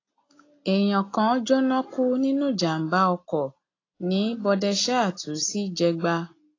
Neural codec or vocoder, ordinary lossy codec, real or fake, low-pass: none; AAC, 32 kbps; real; 7.2 kHz